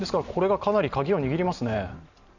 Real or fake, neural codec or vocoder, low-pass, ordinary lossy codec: fake; vocoder, 44.1 kHz, 128 mel bands every 512 samples, BigVGAN v2; 7.2 kHz; none